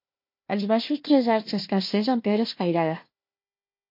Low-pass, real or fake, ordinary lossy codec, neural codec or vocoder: 5.4 kHz; fake; MP3, 32 kbps; codec, 16 kHz, 1 kbps, FunCodec, trained on Chinese and English, 50 frames a second